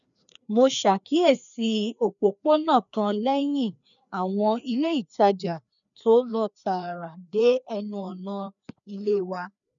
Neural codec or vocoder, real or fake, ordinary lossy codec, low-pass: codec, 16 kHz, 2 kbps, FreqCodec, larger model; fake; none; 7.2 kHz